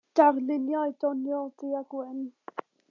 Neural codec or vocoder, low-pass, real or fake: none; 7.2 kHz; real